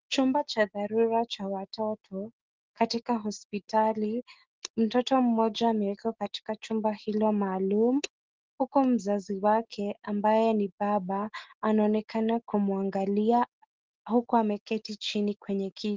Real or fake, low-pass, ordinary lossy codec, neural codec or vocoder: real; 7.2 kHz; Opus, 16 kbps; none